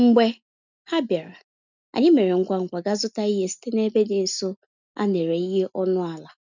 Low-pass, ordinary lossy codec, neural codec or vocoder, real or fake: 7.2 kHz; none; none; real